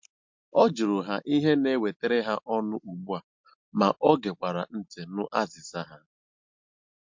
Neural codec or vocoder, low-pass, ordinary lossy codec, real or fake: none; 7.2 kHz; MP3, 48 kbps; real